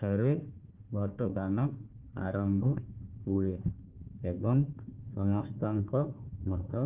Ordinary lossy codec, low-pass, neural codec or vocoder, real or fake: Opus, 24 kbps; 3.6 kHz; codec, 16 kHz, 1 kbps, FunCodec, trained on Chinese and English, 50 frames a second; fake